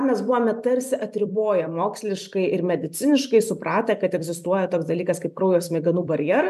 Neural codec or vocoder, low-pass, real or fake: vocoder, 44.1 kHz, 128 mel bands every 256 samples, BigVGAN v2; 14.4 kHz; fake